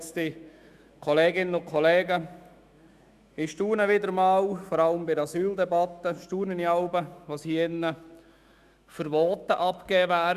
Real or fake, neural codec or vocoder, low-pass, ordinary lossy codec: fake; autoencoder, 48 kHz, 128 numbers a frame, DAC-VAE, trained on Japanese speech; 14.4 kHz; Opus, 64 kbps